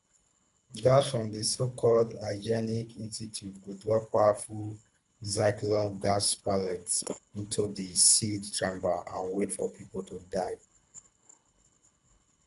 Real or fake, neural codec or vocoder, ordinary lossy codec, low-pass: fake; codec, 24 kHz, 3 kbps, HILCodec; none; 10.8 kHz